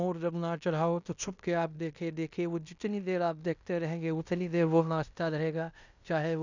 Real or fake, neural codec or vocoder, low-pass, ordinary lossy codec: fake; codec, 16 kHz in and 24 kHz out, 0.9 kbps, LongCat-Audio-Codec, fine tuned four codebook decoder; 7.2 kHz; none